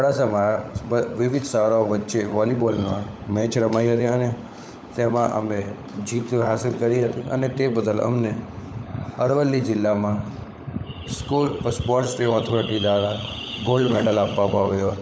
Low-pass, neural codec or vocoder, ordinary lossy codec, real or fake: none; codec, 16 kHz, 16 kbps, FunCodec, trained on LibriTTS, 50 frames a second; none; fake